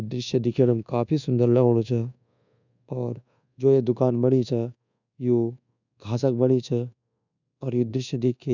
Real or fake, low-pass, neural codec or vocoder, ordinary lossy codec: fake; 7.2 kHz; codec, 24 kHz, 1.2 kbps, DualCodec; none